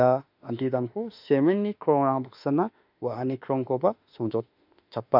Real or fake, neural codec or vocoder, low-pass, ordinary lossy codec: fake; autoencoder, 48 kHz, 32 numbers a frame, DAC-VAE, trained on Japanese speech; 5.4 kHz; none